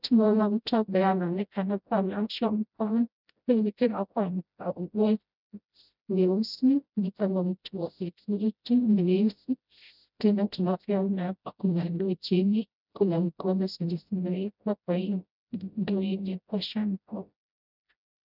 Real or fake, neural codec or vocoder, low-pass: fake; codec, 16 kHz, 0.5 kbps, FreqCodec, smaller model; 5.4 kHz